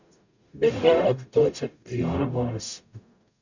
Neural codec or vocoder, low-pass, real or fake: codec, 44.1 kHz, 0.9 kbps, DAC; 7.2 kHz; fake